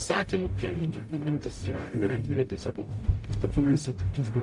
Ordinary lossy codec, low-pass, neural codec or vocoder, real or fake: MP3, 64 kbps; 10.8 kHz; codec, 44.1 kHz, 0.9 kbps, DAC; fake